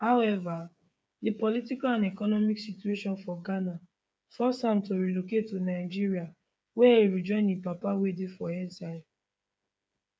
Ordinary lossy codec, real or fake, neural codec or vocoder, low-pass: none; fake; codec, 16 kHz, 8 kbps, FreqCodec, smaller model; none